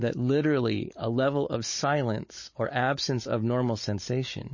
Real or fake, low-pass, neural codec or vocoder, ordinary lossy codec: fake; 7.2 kHz; codec, 16 kHz, 16 kbps, FunCodec, trained on Chinese and English, 50 frames a second; MP3, 32 kbps